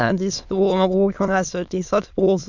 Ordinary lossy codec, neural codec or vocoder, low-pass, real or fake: none; autoencoder, 22.05 kHz, a latent of 192 numbers a frame, VITS, trained on many speakers; 7.2 kHz; fake